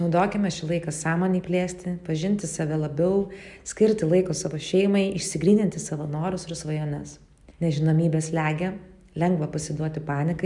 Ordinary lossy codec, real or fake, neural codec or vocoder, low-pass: MP3, 96 kbps; real; none; 10.8 kHz